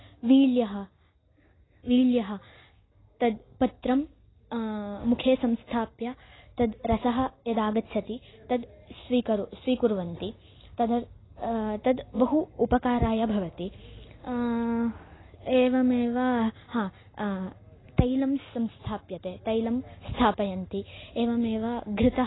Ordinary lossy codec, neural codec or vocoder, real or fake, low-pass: AAC, 16 kbps; none; real; 7.2 kHz